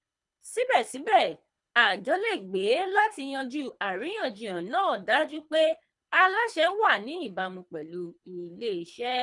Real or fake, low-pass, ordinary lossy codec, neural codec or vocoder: fake; 10.8 kHz; none; codec, 24 kHz, 3 kbps, HILCodec